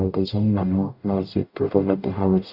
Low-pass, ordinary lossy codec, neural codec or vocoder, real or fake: 5.4 kHz; Opus, 64 kbps; codec, 44.1 kHz, 0.9 kbps, DAC; fake